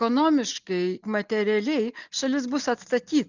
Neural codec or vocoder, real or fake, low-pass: none; real; 7.2 kHz